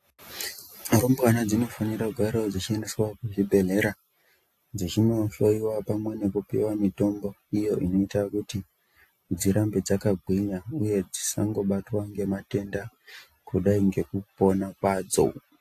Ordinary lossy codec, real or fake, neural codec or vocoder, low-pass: AAC, 64 kbps; real; none; 14.4 kHz